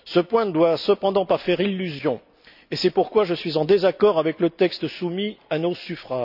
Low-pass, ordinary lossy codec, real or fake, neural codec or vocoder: 5.4 kHz; none; real; none